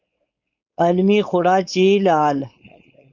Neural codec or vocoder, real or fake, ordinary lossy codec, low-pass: codec, 16 kHz, 4.8 kbps, FACodec; fake; Opus, 64 kbps; 7.2 kHz